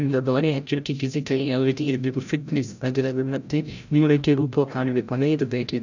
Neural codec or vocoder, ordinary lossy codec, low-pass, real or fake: codec, 16 kHz, 0.5 kbps, FreqCodec, larger model; none; 7.2 kHz; fake